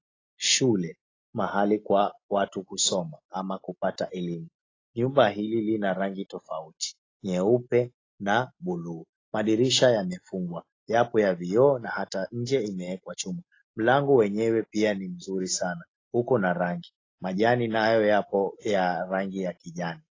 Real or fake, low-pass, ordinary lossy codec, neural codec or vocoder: real; 7.2 kHz; AAC, 32 kbps; none